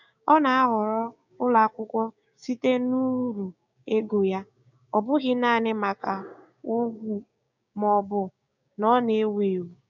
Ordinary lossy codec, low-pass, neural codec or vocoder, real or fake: none; 7.2 kHz; codec, 16 kHz, 6 kbps, DAC; fake